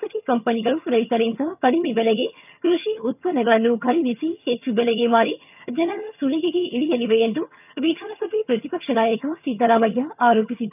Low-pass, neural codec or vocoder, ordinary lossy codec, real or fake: 3.6 kHz; vocoder, 22.05 kHz, 80 mel bands, HiFi-GAN; none; fake